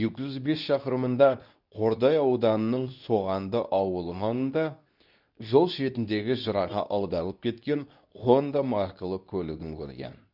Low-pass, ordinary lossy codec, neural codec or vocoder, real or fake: 5.4 kHz; none; codec, 24 kHz, 0.9 kbps, WavTokenizer, medium speech release version 2; fake